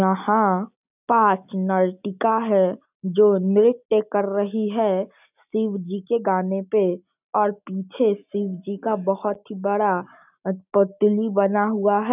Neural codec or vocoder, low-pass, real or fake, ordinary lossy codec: none; 3.6 kHz; real; none